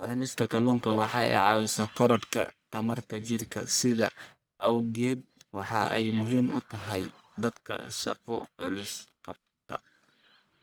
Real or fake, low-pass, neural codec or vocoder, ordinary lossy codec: fake; none; codec, 44.1 kHz, 1.7 kbps, Pupu-Codec; none